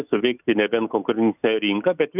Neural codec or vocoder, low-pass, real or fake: none; 3.6 kHz; real